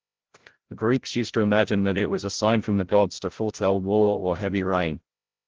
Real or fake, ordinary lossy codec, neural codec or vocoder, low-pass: fake; Opus, 16 kbps; codec, 16 kHz, 0.5 kbps, FreqCodec, larger model; 7.2 kHz